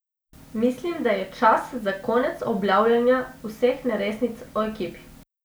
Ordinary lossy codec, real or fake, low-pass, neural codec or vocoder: none; real; none; none